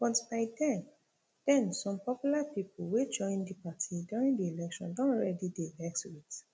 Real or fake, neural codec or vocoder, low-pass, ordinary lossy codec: real; none; none; none